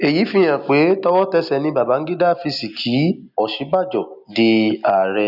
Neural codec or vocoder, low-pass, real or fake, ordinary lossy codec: none; 5.4 kHz; real; none